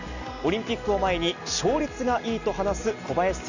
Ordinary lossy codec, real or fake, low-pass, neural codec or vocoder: none; real; 7.2 kHz; none